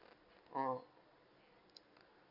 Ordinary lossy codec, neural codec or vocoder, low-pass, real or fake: none; none; 5.4 kHz; real